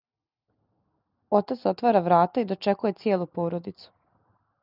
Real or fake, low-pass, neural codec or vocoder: real; 5.4 kHz; none